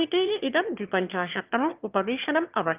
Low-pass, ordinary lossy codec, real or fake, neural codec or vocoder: 3.6 kHz; Opus, 24 kbps; fake; autoencoder, 22.05 kHz, a latent of 192 numbers a frame, VITS, trained on one speaker